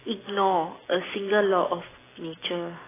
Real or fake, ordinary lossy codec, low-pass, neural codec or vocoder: real; AAC, 16 kbps; 3.6 kHz; none